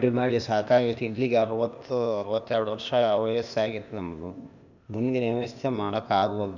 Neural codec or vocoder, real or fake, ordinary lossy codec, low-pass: codec, 16 kHz, 0.8 kbps, ZipCodec; fake; none; 7.2 kHz